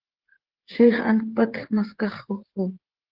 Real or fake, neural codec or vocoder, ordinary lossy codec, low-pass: fake; codec, 16 kHz, 8 kbps, FreqCodec, smaller model; Opus, 16 kbps; 5.4 kHz